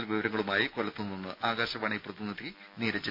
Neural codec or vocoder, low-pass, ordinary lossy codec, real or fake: none; 5.4 kHz; none; real